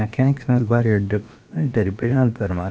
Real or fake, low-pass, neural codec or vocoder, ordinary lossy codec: fake; none; codec, 16 kHz, about 1 kbps, DyCAST, with the encoder's durations; none